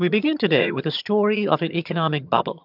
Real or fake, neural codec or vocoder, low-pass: fake; vocoder, 22.05 kHz, 80 mel bands, HiFi-GAN; 5.4 kHz